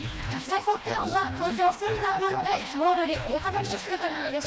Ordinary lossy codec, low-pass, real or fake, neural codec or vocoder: none; none; fake; codec, 16 kHz, 1 kbps, FreqCodec, smaller model